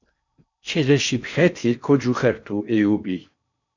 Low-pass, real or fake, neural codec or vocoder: 7.2 kHz; fake; codec, 16 kHz in and 24 kHz out, 0.6 kbps, FocalCodec, streaming, 4096 codes